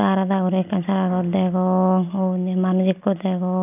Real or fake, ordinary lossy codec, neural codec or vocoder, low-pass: real; none; none; 3.6 kHz